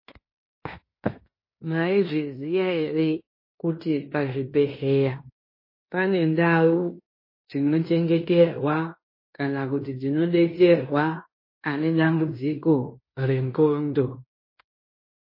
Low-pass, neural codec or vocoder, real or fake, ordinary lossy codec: 5.4 kHz; codec, 16 kHz in and 24 kHz out, 0.9 kbps, LongCat-Audio-Codec, fine tuned four codebook decoder; fake; MP3, 24 kbps